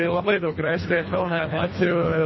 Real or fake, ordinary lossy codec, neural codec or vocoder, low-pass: fake; MP3, 24 kbps; codec, 24 kHz, 1.5 kbps, HILCodec; 7.2 kHz